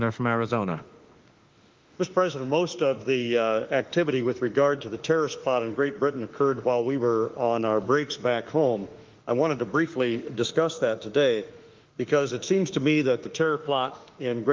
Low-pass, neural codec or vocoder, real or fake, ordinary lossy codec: 7.2 kHz; autoencoder, 48 kHz, 32 numbers a frame, DAC-VAE, trained on Japanese speech; fake; Opus, 32 kbps